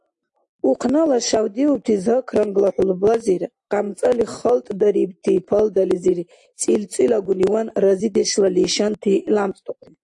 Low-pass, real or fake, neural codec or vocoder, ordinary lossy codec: 10.8 kHz; real; none; AAC, 64 kbps